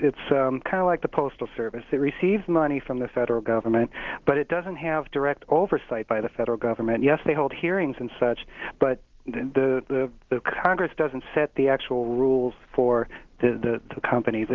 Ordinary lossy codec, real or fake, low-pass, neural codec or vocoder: Opus, 16 kbps; real; 7.2 kHz; none